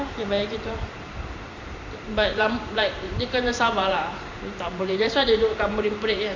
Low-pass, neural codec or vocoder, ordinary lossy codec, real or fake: 7.2 kHz; vocoder, 44.1 kHz, 128 mel bands every 512 samples, BigVGAN v2; MP3, 48 kbps; fake